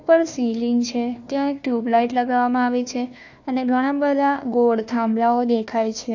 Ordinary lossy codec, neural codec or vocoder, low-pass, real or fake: AAC, 48 kbps; codec, 16 kHz, 1 kbps, FunCodec, trained on Chinese and English, 50 frames a second; 7.2 kHz; fake